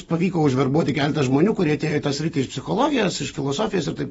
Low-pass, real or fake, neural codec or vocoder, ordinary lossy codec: 14.4 kHz; real; none; AAC, 24 kbps